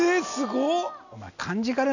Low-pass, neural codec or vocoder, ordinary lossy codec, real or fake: 7.2 kHz; none; none; real